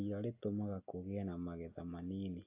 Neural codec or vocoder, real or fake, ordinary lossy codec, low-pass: none; real; AAC, 32 kbps; 3.6 kHz